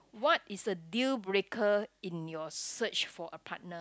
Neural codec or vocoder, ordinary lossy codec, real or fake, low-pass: none; none; real; none